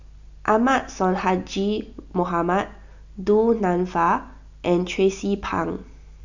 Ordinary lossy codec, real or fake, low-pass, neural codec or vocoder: none; real; 7.2 kHz; none